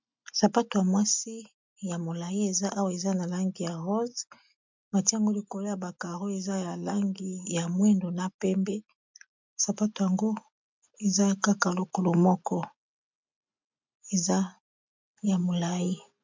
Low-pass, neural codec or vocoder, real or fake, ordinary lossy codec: 7.2 kHz; none; real; MP3, 64 kbps